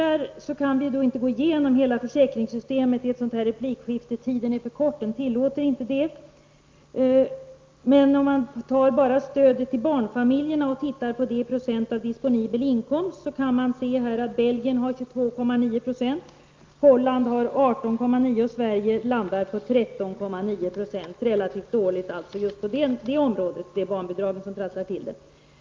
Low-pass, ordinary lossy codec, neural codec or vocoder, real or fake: 7.2 kHz; Opus, 24 kbps; none; real